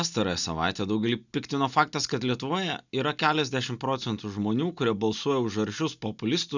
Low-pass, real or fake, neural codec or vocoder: 7.2 kHz; real; none